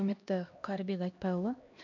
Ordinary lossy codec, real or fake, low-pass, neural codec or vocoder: MP3, 48 kbps; fake; 7.2 kHz; codec, 16 kHz, 1 kbps, X-Codec, HuBERT features, trained on LibriSpeech